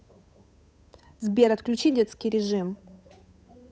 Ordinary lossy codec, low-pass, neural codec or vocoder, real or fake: none; none; codec, 16 kHz, 8 kbps, FunCodec, trained on Chinese and English, 25 frames a second; fake